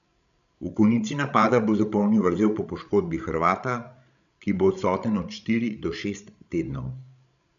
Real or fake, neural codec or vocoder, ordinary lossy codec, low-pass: fake; codec, 16 kHz, 16 kbps, FreqCodec, larger model; none; 7.2 kHz